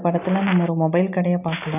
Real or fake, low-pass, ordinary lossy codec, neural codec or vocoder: real; 3.6 kHz; none; none